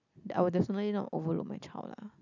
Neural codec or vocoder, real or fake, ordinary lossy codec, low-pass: none; real; none; 7.2 kHz